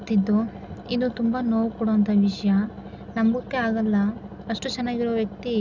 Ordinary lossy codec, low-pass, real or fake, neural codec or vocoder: none; 7.2 kHz; real; none